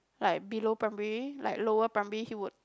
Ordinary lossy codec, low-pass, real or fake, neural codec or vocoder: none; none; real; none